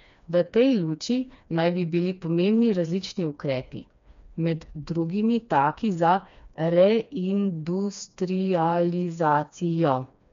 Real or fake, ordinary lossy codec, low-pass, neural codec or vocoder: fake; MP3, 64 kbps; 7.2 kHz; codec, 16 kHz, 2 kbps, FreqCodec, smaller model